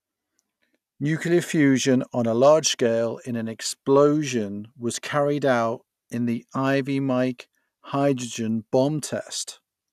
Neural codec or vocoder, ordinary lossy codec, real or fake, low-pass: none; none; real; 14.4 kHz